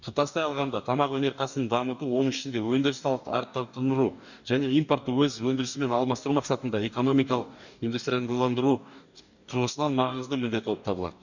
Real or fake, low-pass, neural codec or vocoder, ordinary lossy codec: fake; 7.2 kHz; codec, 44.1 kHz, 2.6 kbps, DAC; none